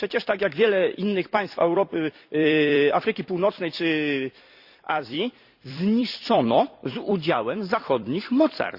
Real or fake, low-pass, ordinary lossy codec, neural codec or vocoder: fake; 5.4 kHz; Opus, 64 kbps; vocoder, 44.1 kHz, 128 mel bands every 256 samples, BigVGAN v2